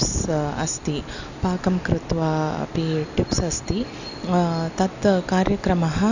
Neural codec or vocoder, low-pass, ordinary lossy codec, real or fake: none; 7.2 kHz; none; real